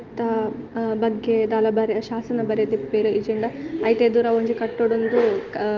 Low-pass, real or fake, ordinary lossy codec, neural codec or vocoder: 7.2 kHz; real; Opus, 32 kbps; none